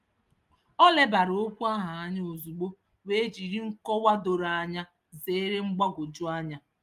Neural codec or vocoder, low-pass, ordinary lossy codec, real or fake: none; 14.4 kHz; Opus, 32 kbps; real